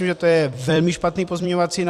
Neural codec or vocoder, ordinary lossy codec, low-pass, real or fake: vocoder, 44.1 kHz, 128 mel bands every 256 samples, BigVGAN v2; Opus, 64 kbps; 14.4 kHz; fake